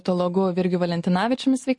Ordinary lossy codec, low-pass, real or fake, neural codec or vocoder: MP3, 48 kbps; 10.8 kHz; real; none